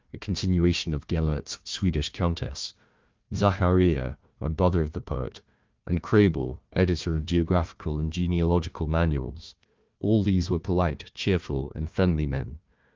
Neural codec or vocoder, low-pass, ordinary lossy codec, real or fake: codec, 16 kHz, 1 kbps, FunCodec, trained on Chinese and English, 50 frames a second; 7.2 kHz; Opus, 32 kbps; fake